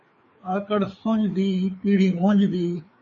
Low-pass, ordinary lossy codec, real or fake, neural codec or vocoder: 7.2 kHz; MP3, 32 kbps; fake; codec, 16 kHz, 4 kbps, FreqCodec, larger model